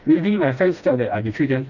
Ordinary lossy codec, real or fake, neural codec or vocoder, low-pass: none; fake; codec, 16 kHz, 1 kbps, FreqCodec, smaller model; 7.2 kHz